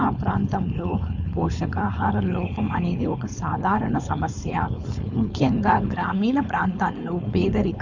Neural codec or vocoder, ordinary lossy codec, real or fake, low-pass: codec, 16 kHz, 4.8 kbps, FACodec; none; fake; 7.2 kHz